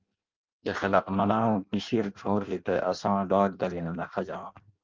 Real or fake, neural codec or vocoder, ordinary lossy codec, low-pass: fake; codec, 16 kHz in and 24 kHz out, 0.6 kbps, FireRedTTS-2 codec; Opus, 32 kbps; 7.2 kHz